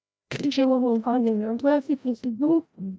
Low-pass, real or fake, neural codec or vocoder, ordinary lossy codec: none; fake; codec, 16 kHz, 0.5 kbps, FreqCodec, larger model; none